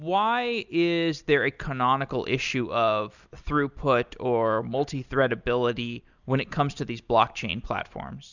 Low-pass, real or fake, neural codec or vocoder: 7.2 kHz; real; none